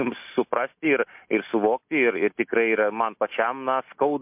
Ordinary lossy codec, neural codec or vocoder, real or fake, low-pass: MP3, 32 kbps; none; real; 3.6 kHz